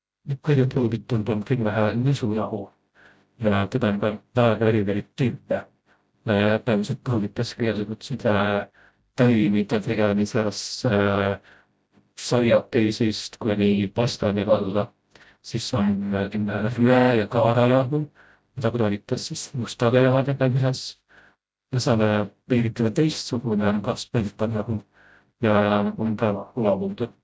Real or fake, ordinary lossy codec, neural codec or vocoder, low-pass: fake; none; codec, 16 kHz, 0.5 kbps, FreqCodec, smaller model; none